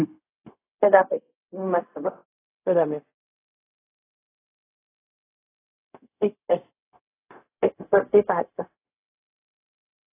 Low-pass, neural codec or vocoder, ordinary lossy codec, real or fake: 3.6 kHz; codec, 16 kHz, 0.4 kbps, LongCat-Audio-Codec; AAC, 24 kbps; fake